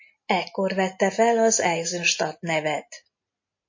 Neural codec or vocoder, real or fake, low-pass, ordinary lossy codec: none; real; 7.2 kHz; MP3, 32 kbps